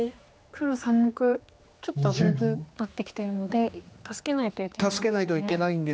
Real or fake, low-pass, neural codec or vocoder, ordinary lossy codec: fake; none; codec, 16 kHz, 2 kbps, X-Codec, HuBERT features, trained on general audio; none